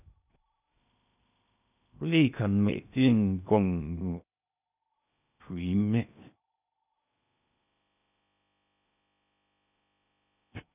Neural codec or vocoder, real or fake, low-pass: codec, 16 kHz in and 24 kHz out, 0.8 kbps, FocalCodec, streaming, 65536 codes; fake; 3.6 kHz